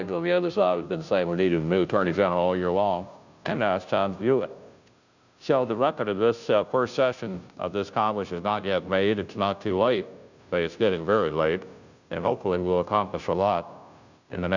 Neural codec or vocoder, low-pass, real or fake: codec, 16 kHz, 0.5 kbps, FunCodec, trained on Chinese and English, 25 frames a second; 7.2 kHz; fake